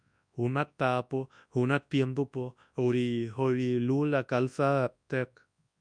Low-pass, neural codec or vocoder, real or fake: 9.9 kHz; codec, 24 kHz, 0.9 kbps, WavTokenizer, large speech release; fake